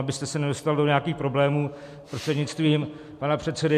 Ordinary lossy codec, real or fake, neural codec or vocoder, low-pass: MP3, 64 kbps; real; none; 14.4 kHz